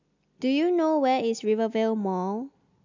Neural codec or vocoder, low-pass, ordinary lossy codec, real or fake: none; 7.2 kHz; none; real